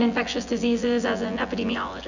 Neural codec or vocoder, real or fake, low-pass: vocoder, 24 kHz, 100 mel bands, Vocos; fake; 7.2 kHz